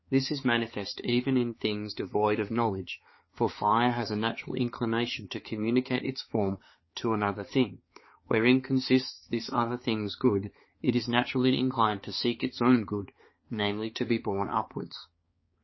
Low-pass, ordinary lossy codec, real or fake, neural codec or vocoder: 7.2 kHz; MP3, 24 kbps; fake; codec, 16 kHz, 4 kbps, X-Codec, HuBERT features, trained on balanced general audio